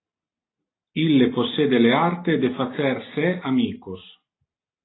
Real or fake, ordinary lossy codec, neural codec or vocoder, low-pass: real; AAC, 16 kbps; none; 7.2 kHz